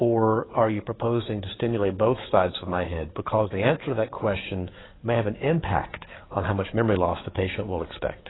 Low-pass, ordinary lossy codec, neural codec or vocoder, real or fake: 7.2 kHz; AAC, 16 kbps; codec, 44.1 kHz, 7.8 kbps, DAC; fake